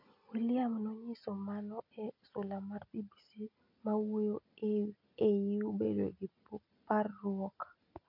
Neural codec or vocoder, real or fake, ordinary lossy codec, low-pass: none; real; MP3, 32 kbps; 5.4 kHz